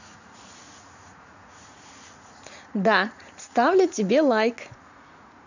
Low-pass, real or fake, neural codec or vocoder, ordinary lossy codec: 7.2 kHz; fake; vocoder, 44.1 kHz, 80 mel bands, Vocos; none